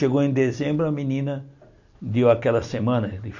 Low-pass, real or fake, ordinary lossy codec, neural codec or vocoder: 7.2 kHz; real; none; none